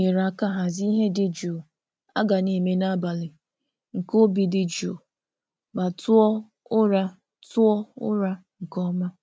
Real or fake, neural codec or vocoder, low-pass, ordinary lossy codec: real; none; none; none